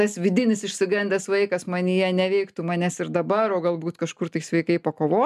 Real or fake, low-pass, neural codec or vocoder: real; 14.4 kHz; none